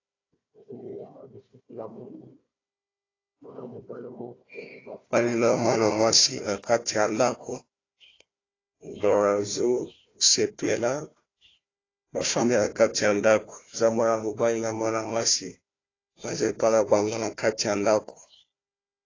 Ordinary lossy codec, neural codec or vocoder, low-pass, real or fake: AAC, 32 kbps; codec, 16 kHz, 1 kbps, FunCodec, trained on Chinese and English, 50 frames a second; 7.2 kHz; fake